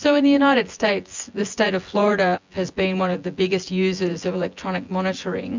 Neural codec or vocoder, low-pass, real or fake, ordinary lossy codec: vocoder, 24 kHz, 100 mel bands, Vocos; 7.2 kHz; fake; MP3, 64 kbps